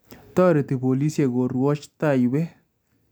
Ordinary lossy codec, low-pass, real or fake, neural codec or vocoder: none; none; real; none